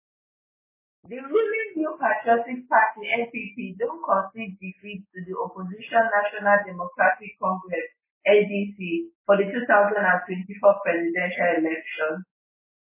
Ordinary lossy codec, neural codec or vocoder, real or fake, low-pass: MP3, 16 kbps; none; real; 3.6 kHz